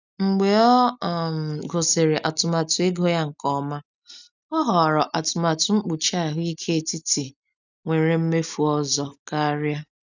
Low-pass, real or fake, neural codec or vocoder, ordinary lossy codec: 7.2 kHz; real; none; none